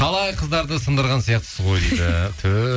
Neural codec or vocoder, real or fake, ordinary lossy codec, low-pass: none; real; none; none